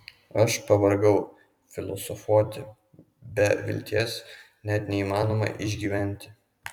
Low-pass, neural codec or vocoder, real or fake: 19.8 kHz; vocoder, 44.1 kHz, 128 mel bands every 256 samples, BigVGAN v2; fake